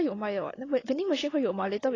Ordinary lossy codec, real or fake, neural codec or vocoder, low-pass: AAC, 32 kbps; real; none; 7.2 kHz